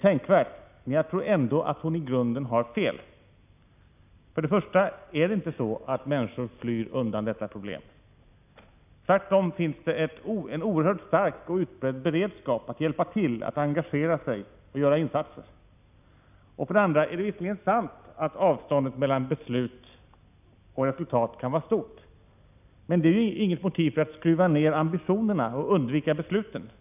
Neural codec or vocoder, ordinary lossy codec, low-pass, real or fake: none; none; 3.6 kHz; real